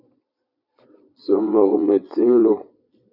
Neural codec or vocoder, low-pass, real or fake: vocoder, 22.05 kHz, 80 mel bands, Vocos; 5.4 kHz; fake